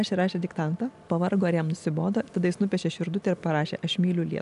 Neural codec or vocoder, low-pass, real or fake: none; 10.8 kHz; real